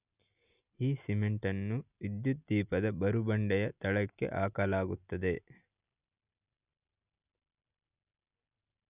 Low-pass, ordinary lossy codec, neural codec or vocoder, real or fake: 3.6 kHz; none; none; real